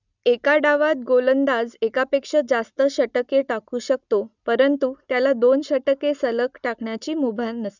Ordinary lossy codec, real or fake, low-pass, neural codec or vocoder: none; real; 7.2 kHz; none